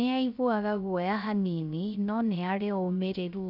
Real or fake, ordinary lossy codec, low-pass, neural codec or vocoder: fake; none; 5.4 kHz; codec, 16 kHz, 0.3 kbps, FocalCodec